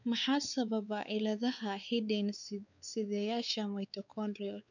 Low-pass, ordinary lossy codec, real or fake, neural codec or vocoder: 7.2 kHz; none; fake; codec, 16 kHz, 4 kbps, X-Codec, WavLM features, trained on Multilingual LibriSpeech